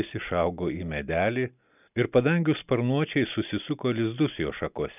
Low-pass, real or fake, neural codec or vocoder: 3.6 kHz; real; none